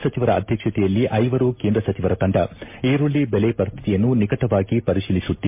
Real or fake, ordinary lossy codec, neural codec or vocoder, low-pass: real; MP3, 24 kbps; none; 3.6 kHz